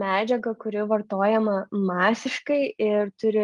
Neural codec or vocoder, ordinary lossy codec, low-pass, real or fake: none; Opus, 32 kbps; 10.8 kHz; real